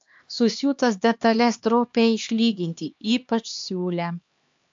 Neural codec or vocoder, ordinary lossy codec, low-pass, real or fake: codec, 16 kHz, 2 kbps, X-Codec, HuBERT features, trained on LibriSpeech; AAC, 64 kbps; 7.2 kHz; fake